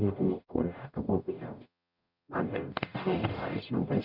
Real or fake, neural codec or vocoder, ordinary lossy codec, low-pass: fake; codec, 44.1 kHz, 0.9 kbps, DAC; none; 5.4 kHz